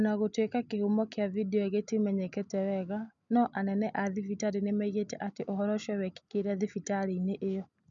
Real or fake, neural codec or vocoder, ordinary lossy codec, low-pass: real; none; none; 7.2 kHz